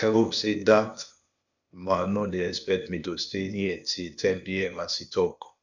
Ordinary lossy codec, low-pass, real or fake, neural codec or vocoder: none; 7.2 kHz; fake; codec, 16 kHz, 0.8 kbps, ZipCodec